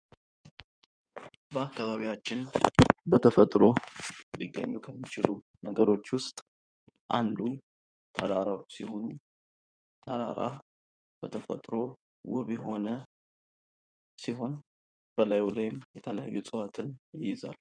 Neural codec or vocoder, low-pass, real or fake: codec, 16 kHz in and 24 kHz out, 2.2 kbps, FireRedTTS-2 codec; 9.9 kHz; fake